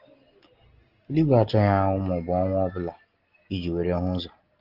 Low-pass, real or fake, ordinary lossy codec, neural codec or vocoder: 5.4 kHz; real; Opus, 16 kbps; none